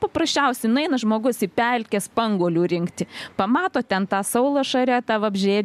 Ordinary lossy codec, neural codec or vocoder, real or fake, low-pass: MP3, 96 kbps; none; real; 14.4 kHz